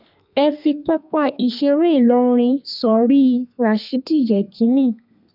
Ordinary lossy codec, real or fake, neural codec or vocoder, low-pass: none; fake; codec, 32 kHz, 1.9 kbps, SNAC; 5.4 kHz